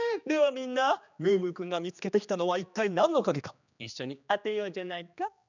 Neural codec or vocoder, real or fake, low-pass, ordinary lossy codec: codec, 16 kHz, 2 kbps, X-Codec, HuBERT features, trained on general audio; fake; 7.2 kHz; none